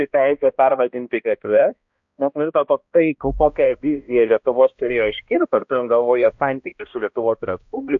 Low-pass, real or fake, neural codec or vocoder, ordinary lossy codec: 7.2 kHz; fake; codec, 16 kHz, 1 kbps, X-Codec, HuBERT features, trained on balanced general audio; AAC, 64 kbps